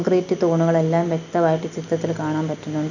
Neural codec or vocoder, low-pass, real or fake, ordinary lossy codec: none; 7.2 kHz; real; none